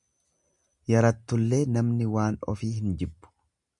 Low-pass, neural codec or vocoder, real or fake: 10.8 kHz; none; real